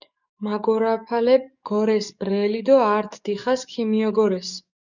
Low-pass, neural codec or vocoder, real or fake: 7.2 kHz; codec, 44.1 kHz, 7.8 kbps, DAC; fake